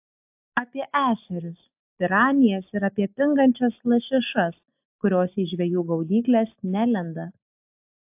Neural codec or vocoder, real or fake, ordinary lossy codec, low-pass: none; real; AAC, 32 kbps; 3.6 kHz